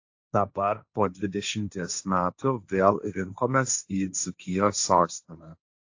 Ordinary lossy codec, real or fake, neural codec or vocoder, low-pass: AAC, 48 kbps; fake; codec, 16 kHz, 1.1 kbps, Voila-Tokenizer; 7.2 kHz